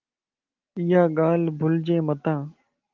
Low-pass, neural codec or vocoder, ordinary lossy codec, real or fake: 7.2 kHz; none; Opus, 32 kbps; real